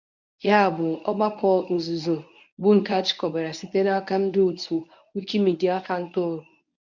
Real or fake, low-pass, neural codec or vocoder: fake; 7.2 kHz; codec, 24 kHz, 0.9 kbps, WavTokenizer, medium speech release version 1